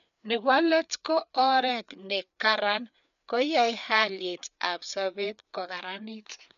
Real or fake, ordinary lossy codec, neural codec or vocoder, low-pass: fake; none; codec, 16 kHz, 4 kbps, FreqCodec, larger model; 7.2 kHz